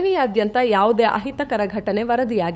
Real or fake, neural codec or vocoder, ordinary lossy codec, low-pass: fake; codec, 16 kHz, 8 kbps, FunCodec, trained on LibriTTS, 25 frames a second; none; none